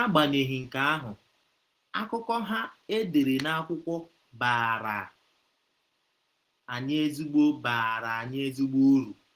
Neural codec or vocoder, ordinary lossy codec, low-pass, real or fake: none; Opus, 16 kbps; 14.4 kHz; real